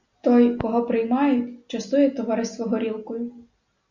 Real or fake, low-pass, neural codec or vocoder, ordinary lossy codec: real; 7.2 kHz; none; Opus, 64 kbps